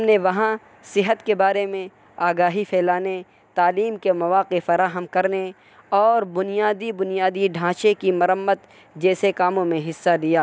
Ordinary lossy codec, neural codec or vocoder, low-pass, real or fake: none; none; none; real